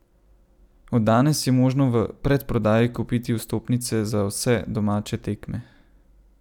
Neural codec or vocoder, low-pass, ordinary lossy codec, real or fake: none; 19.8 kHz; none; real